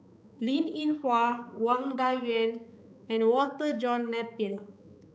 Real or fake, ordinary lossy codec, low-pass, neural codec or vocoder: fake; none; none; codec, 16 kHz, 4 kbps, X-Codec, HuBERT features, trained on balanced general audio